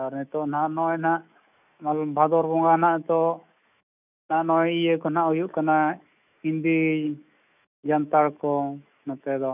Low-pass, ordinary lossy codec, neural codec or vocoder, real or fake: 3.6 kHz; none; autoencoder, 48 kHz, 128 numbers a frame, DAC-VAE, trained on Japanese speech; fake